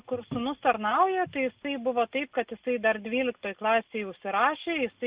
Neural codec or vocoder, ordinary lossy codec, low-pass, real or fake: none; Opus, 64 kbps; 3.6 kHz; real